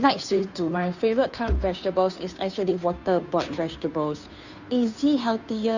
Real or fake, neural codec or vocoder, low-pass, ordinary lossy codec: fake; codec, 16 kHz, 2 kbps, FunCodec, trained on Chinese and English, 25 frames a second; 7.2 kHz; none